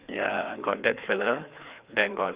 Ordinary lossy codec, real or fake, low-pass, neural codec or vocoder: Opus, 64 kbps; fake; 3.6 kHz; codec, 16 kHz, 2 kbps, FreqCodec, larger model